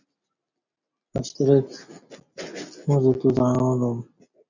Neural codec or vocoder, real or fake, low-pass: none; real; 7.2 kHz